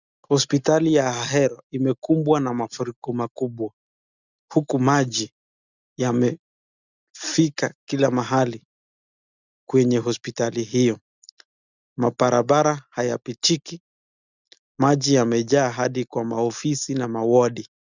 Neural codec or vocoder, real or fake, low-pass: none; real; 7.2 kHz